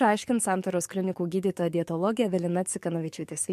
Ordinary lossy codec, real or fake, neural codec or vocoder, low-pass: MP3, 64 kbps; fake; codec, 44.1 kHz, 7.8 kbps, DAC; 14.4 kHz